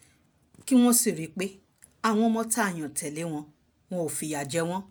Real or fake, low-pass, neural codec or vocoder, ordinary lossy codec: real; none; none; none